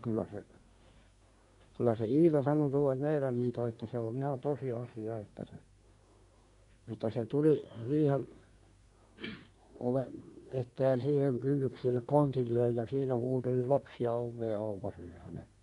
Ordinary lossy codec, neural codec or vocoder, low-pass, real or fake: none; codec, 24 kHz, 1 kbps, SNAC; 10.8 kHz; fake